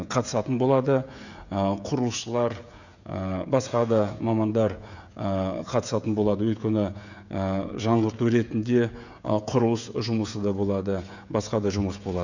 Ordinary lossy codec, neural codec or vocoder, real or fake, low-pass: none; codec, 16 kHz, 16 kbps, FreqCodec, smaller model; fake; 7.2 kHz